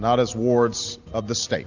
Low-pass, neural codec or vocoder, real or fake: 7.2 kHz; none; real